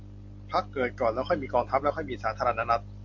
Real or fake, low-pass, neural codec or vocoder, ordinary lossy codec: fake; 7.2 kHz; vocoder, 44.1 kHz, 128 mel bands every 256 samples, BigVGAN v2; MP3, 48 kbps